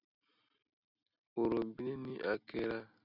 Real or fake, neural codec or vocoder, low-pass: real; none; 5.4 kHz